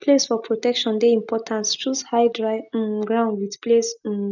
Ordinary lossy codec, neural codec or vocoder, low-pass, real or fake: none; none; 7.2 kHz; real